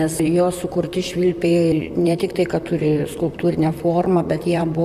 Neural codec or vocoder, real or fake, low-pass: vocoder, 44.1 kHz, 128 mel bands, Pupu-Vocoder; fake; 14.4 kHz